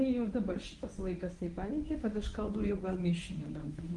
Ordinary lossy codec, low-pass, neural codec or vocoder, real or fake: Opus, 24 kbps; 10.8 kHz; codec, 24 kHz, 0.9 kbps, WavTokenizer, medium speech release version 1; fake